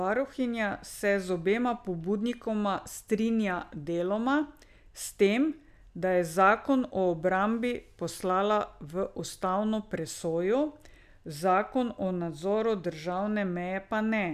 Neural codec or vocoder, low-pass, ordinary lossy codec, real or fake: none; 14.4 kHz; none; real